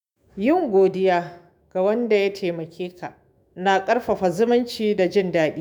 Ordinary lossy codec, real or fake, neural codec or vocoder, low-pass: none; fake; autoencoder, 48 kHz, 128 numbers a frame, DAC-VAE, trained on Japanese speech; none